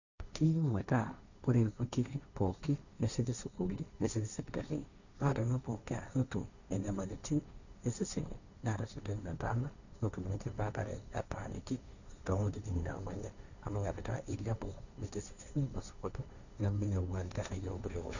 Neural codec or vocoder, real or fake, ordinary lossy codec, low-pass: codec, 16 kHz, 1.1 kbps, Voila-Tokenizer; fake; none; 7.2 kHz